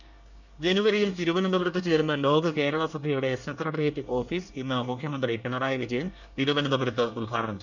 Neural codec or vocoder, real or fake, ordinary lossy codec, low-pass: codec, 24 kHz, 1 kbps, SNAC; fake; none; 7.2 kHz